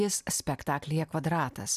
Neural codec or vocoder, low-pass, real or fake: none; 14.4 kHz; real